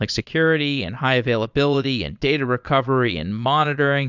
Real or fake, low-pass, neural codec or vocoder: fake; 7.2 kHz; vocoder, 22.05 kHz, 80 mel bands, Vocos